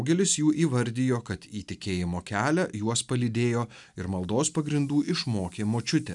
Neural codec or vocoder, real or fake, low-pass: none; real; 10.8 kHz